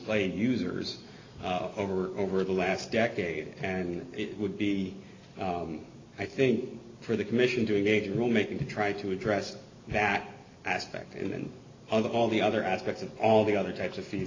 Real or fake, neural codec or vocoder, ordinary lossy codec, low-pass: real; none; AAC, 32 kbps; 7.2 kHz